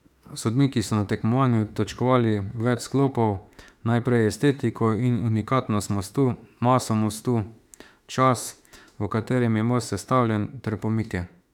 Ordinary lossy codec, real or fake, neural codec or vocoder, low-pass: none; fake; autoencoder, 48 kHz, 32 numbers a frame, DAC-VAE, trained on Japanese speech; 19.8 kHz